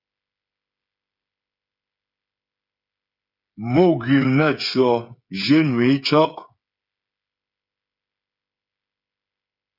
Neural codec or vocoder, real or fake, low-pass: codec, 16 kHz, 8 kbps, FreqCodec, smaller model; fake; 5.4 kHz